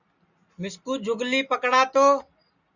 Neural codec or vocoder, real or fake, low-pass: none; real; 7.2 kHz